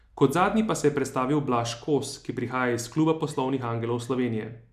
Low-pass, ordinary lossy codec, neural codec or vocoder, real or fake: 14.4 kHz; none; none; real